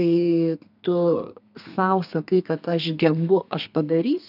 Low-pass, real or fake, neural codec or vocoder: 5.4 kHz; fake; codec, 32 kHz, 1.9 kbps, SNAC